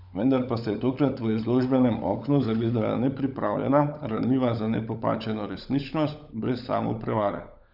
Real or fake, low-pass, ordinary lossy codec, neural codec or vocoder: fake; 5.4 kHz; none; codec, 16 kHz, 16 kbps, FunCodec, trained on LibriTTS, 50 frames a second